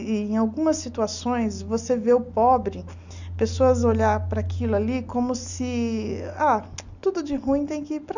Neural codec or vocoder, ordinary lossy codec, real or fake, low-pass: none; none; real; 7.2 kHz